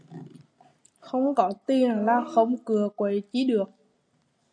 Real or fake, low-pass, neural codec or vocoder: real; 9.9 kHz; none